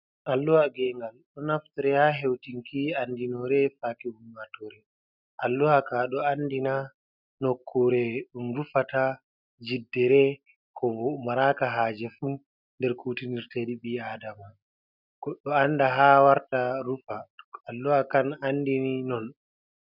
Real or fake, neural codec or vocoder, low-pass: real; none; 5.4 kHz